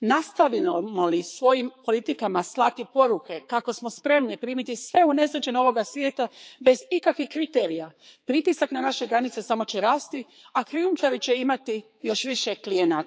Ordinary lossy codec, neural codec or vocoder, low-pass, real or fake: none; codec, 16 kHz, 4 kbps, X-Codec, HuBERT features, trained on balanced general audio; none; fake